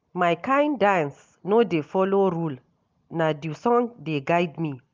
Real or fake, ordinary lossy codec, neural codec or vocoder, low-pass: real; Opus, 24 kbps; none; 7.2 kHz